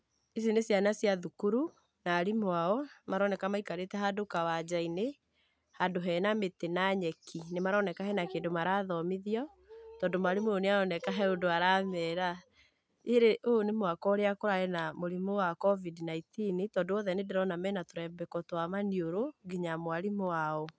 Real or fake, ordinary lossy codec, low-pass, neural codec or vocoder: real; none; none; none